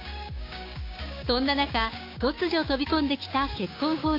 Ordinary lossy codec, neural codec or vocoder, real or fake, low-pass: AAC, 32 kbps; codec, 16 kHz, 6 kbps, DAC; fake; 5.4 kHz